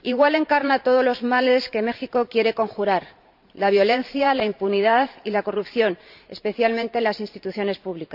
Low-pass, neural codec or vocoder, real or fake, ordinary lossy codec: 5.4 kHz; vocoder, 44.1 kHz, 128 mel bands every 512 samples, BigVGAN v2; fake; none